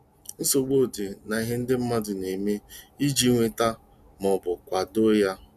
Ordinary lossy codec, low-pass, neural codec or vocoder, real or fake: none; 14.4 kHz; none; real